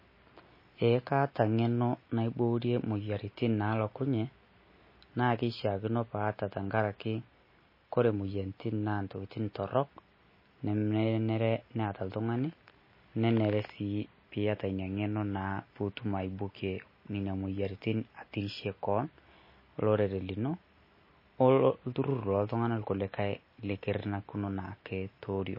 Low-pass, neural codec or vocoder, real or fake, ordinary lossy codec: 5.4 kHz; none; real; MP3, 24 kbps